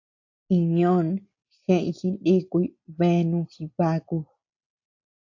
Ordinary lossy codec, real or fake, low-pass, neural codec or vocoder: AAC, 48 kbps; real; 7.2 kHz; none